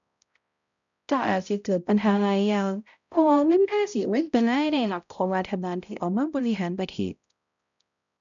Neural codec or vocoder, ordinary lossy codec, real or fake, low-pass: codec, 16 kHz, 0.5 kbps, X-Codec, HuBERT features, trained on balanced general audio; none; fake; 7.2 kHz